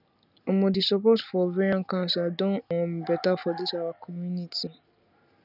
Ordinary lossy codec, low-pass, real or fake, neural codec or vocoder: none; 5.4 kHz; real; none